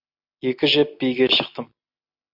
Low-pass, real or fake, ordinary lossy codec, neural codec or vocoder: 5.4 kHz; real; MP3, 48 kbps; none